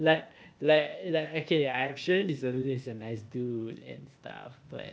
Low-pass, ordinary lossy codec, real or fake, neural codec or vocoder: none; none; fake; codec, 16 kHz, 0.8 kbps, ZipCodec